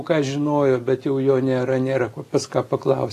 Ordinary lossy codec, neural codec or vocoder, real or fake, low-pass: AAC, 48 kbps; none; real; 14.4 kHz